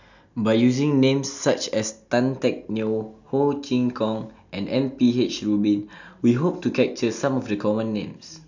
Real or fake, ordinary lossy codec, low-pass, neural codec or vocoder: real; none; 7.2 kHz; none